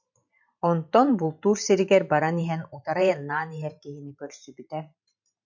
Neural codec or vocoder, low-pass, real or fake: vocoder, 44.1 kHz, 128 mel bands every 512 samples, BigVGAN v2; 7.2 kHz; fake